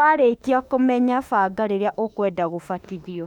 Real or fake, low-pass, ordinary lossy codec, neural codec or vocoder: fake; 19.8 kHz; none; autoencoder, 48 kHz, 32 numbers a frame, DAC-VAE, trained on Japanese speech